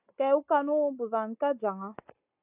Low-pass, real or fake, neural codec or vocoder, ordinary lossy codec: 3.6 kHz; real; none; AAC, 32 kbps